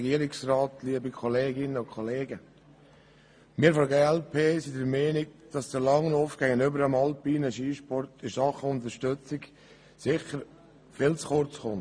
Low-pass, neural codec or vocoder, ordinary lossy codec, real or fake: 9.9 kHz; none; MP3, 64 kbps; real